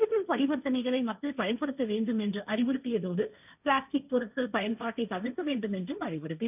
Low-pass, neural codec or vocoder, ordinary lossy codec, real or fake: 3.6 kHz; codec, 16 kHz, 1.1 kbps, Voila-Tokenizer; none; fake